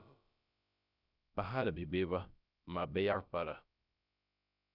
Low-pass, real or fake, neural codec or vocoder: 5.4 kHz; fake; codec, 16 kHz, about 1 kbps, DyCAST, with the encoder's durations